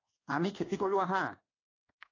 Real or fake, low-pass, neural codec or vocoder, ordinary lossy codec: fake; 7.2 kHz; codec, 24 kHz, 1.2 kbps, DualCodec; AAC, 32 kbps